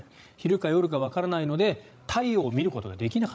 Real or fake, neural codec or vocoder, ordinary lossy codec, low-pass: fake; codec, 16 kHz, 16 kbps, FreqCodec, larger model; none; none